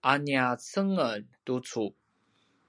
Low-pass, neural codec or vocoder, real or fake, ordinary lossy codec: 9.9 kHz; none; real; MP3, 96 kbps